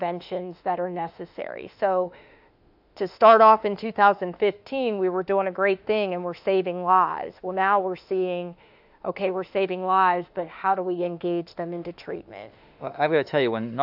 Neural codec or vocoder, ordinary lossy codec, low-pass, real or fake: autoencoder, 48 kHz, 32 numbers a frame, DAC-VAE, trained on Japanese speech; AAC, 48 kbps; 5.4 kHz; fake